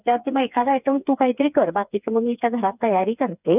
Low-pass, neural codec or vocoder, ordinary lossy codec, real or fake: 3.6 kHz; codec, 16 kHz, 4 kbps, FreqCodec, smaller model; none; fake